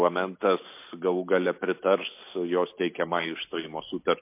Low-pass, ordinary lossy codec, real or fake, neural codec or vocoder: 3.6 kHz; MP3, 24 kbps; real; none